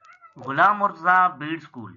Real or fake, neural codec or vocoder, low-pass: real; none; 7.2 kHz